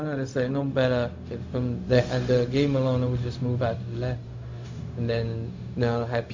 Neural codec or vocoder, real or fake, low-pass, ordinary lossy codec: codec, 16 kHz, 0.4 kbps, LongCat-Audio-Codec; fake; 7.2 kHz; MP3, 48 kbps